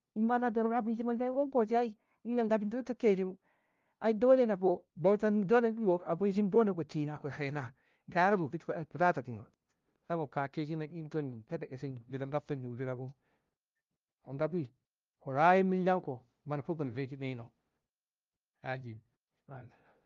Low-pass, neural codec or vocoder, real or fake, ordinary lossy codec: 7.2 kHz; codec, 16 kHz, 0.5 kbps, FunCodec, trained on LibriTTS, 25 frames a second; fake; Opus, 32 kbps